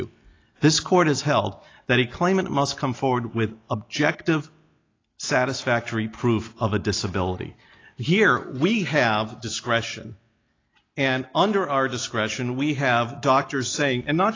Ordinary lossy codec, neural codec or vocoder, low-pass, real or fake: AAC, 32 kbps; none; 7.2 kHz; real